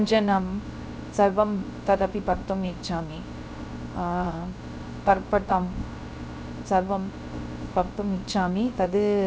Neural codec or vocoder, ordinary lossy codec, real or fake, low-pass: codec, 16 kHz, 0.3 kbps, FocalCodec; none; fake; none